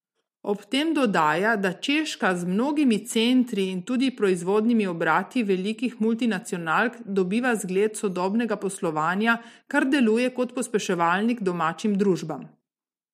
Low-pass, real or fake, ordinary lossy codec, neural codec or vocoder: 14.4 kHz; real; MP3, 64 kbps; none